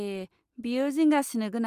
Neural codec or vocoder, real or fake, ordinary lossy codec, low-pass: none; real; Opus, 32 kbps; 14.4 kHz